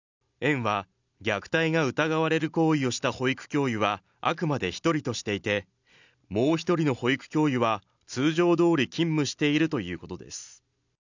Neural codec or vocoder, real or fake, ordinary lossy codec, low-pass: none; real; none; 7.2 kHz